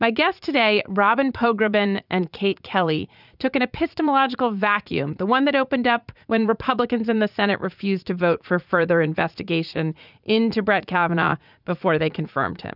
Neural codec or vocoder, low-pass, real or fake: vocoder, 44.1 kHz, 80 mel bands, Vocos; 5.4 kHz; fake